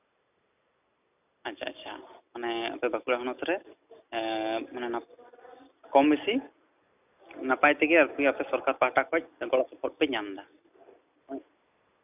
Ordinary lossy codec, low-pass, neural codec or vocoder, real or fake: none; 3.6 kHz; none; real